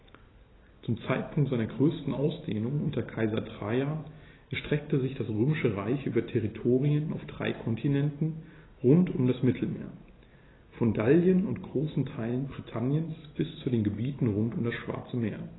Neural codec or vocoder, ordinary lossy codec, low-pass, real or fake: none; AAC, 16 kbps; 7.2 kHz; real